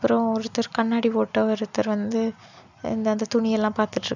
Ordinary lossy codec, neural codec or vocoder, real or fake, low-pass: none; none; real; 7.2 kHz